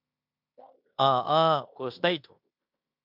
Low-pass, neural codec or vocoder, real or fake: 5.4 kHz; codec, 16 kHz in and 24 kHz out, 0.9 kbps, LongCat-Audio-Codec, fine tuned four codebook decoder; fake